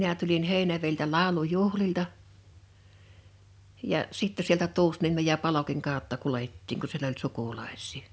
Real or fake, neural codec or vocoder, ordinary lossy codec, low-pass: real; none; none; none